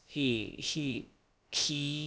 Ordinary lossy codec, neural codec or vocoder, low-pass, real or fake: none; codec, 16 kHz, about 1 kbps, DyCAST, with the encoder's durations; none; fake